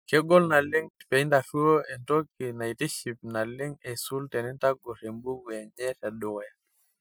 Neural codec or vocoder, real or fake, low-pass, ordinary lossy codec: none; real; none; none